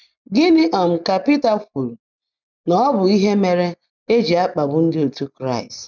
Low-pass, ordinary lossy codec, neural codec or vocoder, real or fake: 7.2 kHz; none; none; real